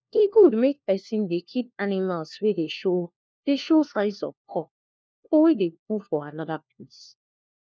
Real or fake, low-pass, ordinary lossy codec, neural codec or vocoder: fake; none; none; codec, 16 kHz, 1 kbps, FunCodec, trained on LibriTTS, 50 frames a second